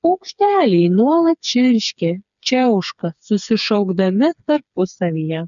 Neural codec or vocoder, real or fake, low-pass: codec, 16 kHz, 4 kbps, FreqCodec, smaller model; fake; 7.2 kHz